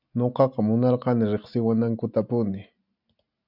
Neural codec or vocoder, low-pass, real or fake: none; 5.4 kHz; real